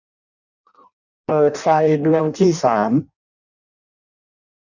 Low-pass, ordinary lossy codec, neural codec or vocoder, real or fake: 7.2 kHz; none; codec, 16 kHz in and 24 kHz out, 0.6 kbps, FireRedTTS-2 codec; fake